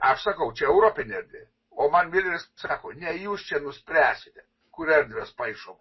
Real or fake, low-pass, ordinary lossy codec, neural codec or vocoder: real; 7.2 kHz; MP3, 24 kbps; none